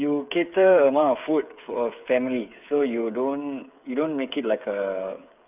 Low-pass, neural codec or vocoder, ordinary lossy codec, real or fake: 3.6 kHz; codec, 16 kHz, 8 kbps, FreqCodec, smaller model; none; fake